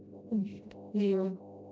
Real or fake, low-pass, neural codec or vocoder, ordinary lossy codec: fake; none; codec, 16 kHz, 0.5 kbps, FreqCodec, smaller model; none